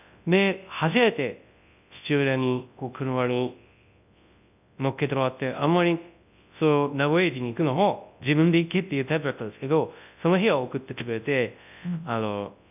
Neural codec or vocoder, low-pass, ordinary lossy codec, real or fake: codec, 24 kHz, 0.9 kbps, WavTokenizer, large speech release; 3.6 kHz; none; fake